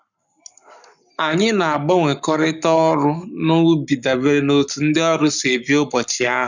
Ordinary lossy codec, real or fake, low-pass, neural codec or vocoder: none; fake; 7.2 kHz; codec, 44.1 kHz, 7.8 kbps, Pupu-Codec